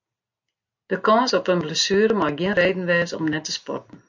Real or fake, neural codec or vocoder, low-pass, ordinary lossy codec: real; none; 7.2 kHz; MP3, 64 kbps